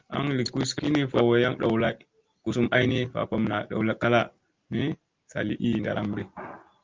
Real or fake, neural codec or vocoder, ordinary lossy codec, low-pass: real; none; Opus, 32 kbps; 7.2 kHz